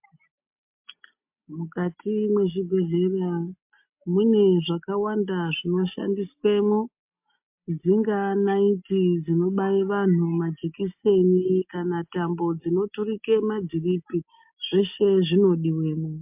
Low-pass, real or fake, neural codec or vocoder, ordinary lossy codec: 3.6 kHz; real; none; MP3, 32 kbps